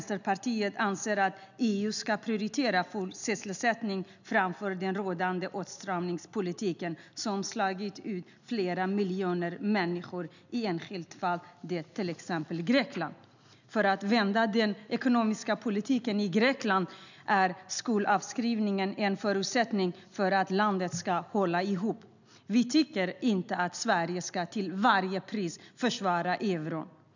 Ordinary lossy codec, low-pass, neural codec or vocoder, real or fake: none; 7.2 kHz; none; real